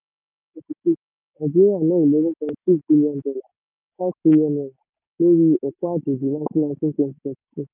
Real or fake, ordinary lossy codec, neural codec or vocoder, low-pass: real; none; none; 3.6 kHz